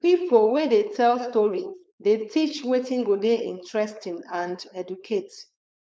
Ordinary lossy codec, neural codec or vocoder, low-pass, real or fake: none; codec, 16 kHz, 4.8 kbps, FACodec; none; fake